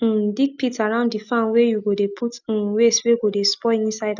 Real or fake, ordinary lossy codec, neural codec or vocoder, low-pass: real; none; none; 7.2 kHz